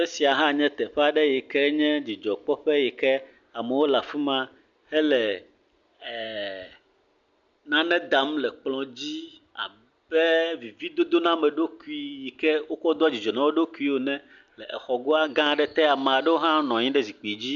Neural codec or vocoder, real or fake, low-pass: none; real; 7.2 kHz